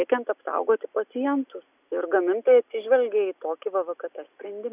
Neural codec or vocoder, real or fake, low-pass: none; real; 3.6 kHz